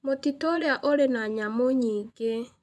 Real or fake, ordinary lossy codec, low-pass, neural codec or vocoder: fake; none; none; vocoder, 24 kHz, 100 mel bands, Vocos